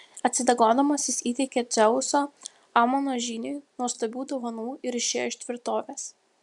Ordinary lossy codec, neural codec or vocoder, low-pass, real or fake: MP3, 96 kbps; none; 10.8 kHz; real